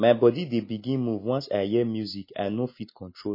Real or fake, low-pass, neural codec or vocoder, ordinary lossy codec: real; 5.4 kHz; none; MP3, 24 kbps